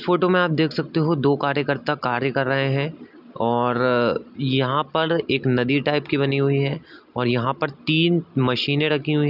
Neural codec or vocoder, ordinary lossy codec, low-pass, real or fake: none; none; 5.4 kHz; real